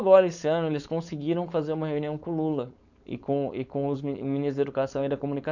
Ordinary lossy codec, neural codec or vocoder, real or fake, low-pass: none; codec, 16 kHz, 4.8 kbps, FACodec; fake; 7.2 kHz